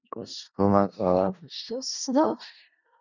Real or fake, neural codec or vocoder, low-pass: fake; codec, 16 kHz in and 24 kHz out, 0.4 kbps, LongCat-Audio-Codec, four codebook decoder; 7.2 kHz